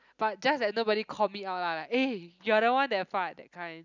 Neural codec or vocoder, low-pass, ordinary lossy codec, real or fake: none; 7.2 kHz; none; real